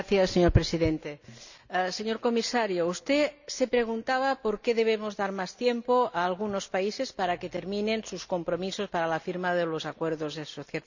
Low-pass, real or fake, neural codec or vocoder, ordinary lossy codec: 7.2 kHz; real; none; none